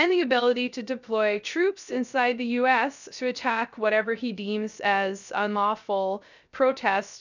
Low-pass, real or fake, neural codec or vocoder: 7.2 kHz; fake; codec, 16 kHz, 0.3 kbps, FocalCodec